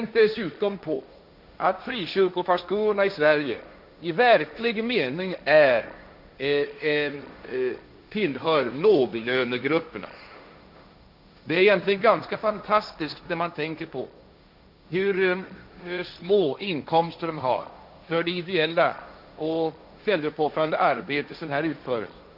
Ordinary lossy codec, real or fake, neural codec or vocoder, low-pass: none; fake; codec, 16 kHz, 1.1 kbps, Voila-Tokenizer; 5.4 kHz